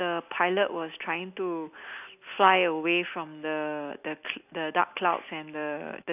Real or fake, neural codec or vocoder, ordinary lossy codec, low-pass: real; none; none; 3.6 kHz